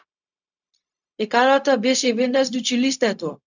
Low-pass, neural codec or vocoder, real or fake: 7.2 kHz; codec, 16 kHz, 0.4 kbps, LongCat-Audio-Codec; fake